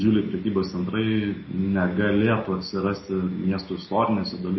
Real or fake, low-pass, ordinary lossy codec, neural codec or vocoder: real; 7.2 kHz; MP3, 24 kbps; none